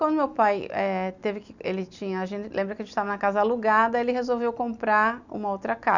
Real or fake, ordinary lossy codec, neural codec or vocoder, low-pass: real; none; none; 7.2 kHz